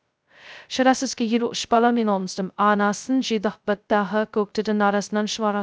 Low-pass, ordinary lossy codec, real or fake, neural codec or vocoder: none; none; fake; codec, 16 kHz, 0.2 kbps, FocalCodec